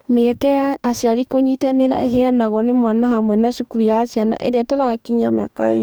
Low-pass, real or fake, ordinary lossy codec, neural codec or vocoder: none; fake; none; codec, 44.1 kHz, 2.6 kbps, DAC